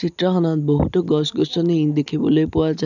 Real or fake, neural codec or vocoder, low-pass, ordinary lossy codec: real; none; 7.2 kHz; none